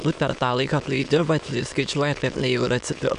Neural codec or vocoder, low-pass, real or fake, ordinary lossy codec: autoencoder, 22.05 kHz, a latent of 192 numbers a frame, VITS, trained on many speakers; 9.9 kHz; fake; MP3, 96 kbps